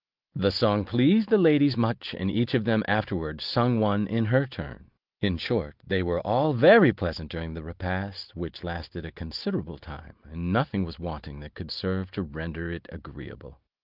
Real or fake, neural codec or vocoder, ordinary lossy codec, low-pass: real; none; Opus, 32 kbps; 5.4 kHz